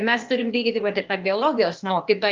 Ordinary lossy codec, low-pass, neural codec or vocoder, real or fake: Opus, 32 kbps; 7.2 kHz; codec, 16 kHz, 0.8 kbps, ZipCodec; fake